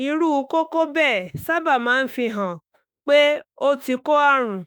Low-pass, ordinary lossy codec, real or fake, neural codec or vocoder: none; none; fake; autoencoder, 48 kHz, 32 numbers a frame, DAC-VAE, trained on Japanese speech